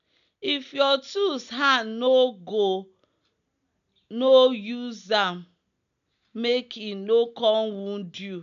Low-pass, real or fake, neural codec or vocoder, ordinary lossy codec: 7.2 kHz; real; none; none